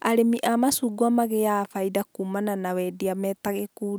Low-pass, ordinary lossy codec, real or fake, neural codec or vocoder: none; none; real; none